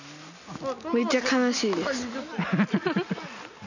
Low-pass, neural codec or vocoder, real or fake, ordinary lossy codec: 7.2 kHz; none; real; none